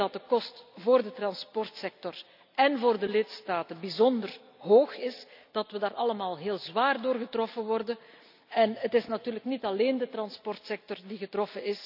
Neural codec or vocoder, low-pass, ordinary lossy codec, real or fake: none; 5.4 kHz; none; real